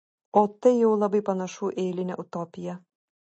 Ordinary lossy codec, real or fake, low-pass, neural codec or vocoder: MP3, 32 kbps; real; 9.9 kHz; none